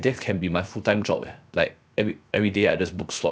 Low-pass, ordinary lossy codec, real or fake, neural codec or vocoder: none; none; fake; codec, 16 kHz, 0.7 kbps, FocalCodec